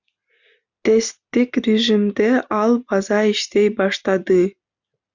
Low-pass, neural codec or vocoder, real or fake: 7.2 kHz; none; real